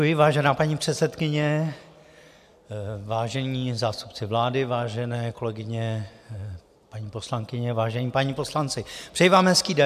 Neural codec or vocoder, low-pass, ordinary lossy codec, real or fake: none; 14.4 kHz; AAC, 96 kbps; real